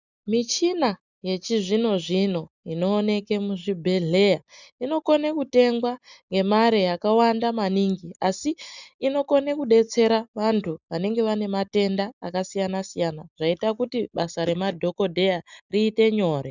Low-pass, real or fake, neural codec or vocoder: 7.2 kHz; real; none